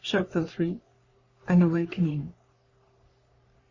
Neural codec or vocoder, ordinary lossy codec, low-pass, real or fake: codec, 44.1 kHz, 3.4 kbps, Pupu-Codec; Opus, 64 kbps; 7.2 kHz; fake